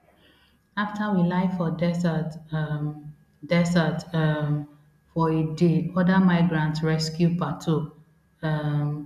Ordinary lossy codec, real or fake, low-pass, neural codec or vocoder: none; real; 14.4 kHz; none